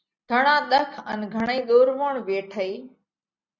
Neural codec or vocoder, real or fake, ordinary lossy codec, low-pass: none; real; Opus, 64 kbps; 7.2 kHz